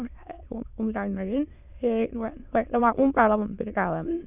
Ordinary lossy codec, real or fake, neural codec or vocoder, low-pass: none; fake; autoencoder, 22.05 kHz, a latent of 192 numbers a frame, VITS, trained on many speakers; 3.6 kHz